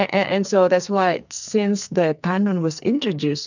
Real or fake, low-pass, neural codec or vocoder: fake; 7.2 kHz; codec, 44.1 kHz, 2.6 kbps, SNAC